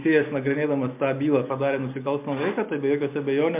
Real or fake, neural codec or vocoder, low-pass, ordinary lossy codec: real; none; 3.6 kHz; MP3, 32 kbps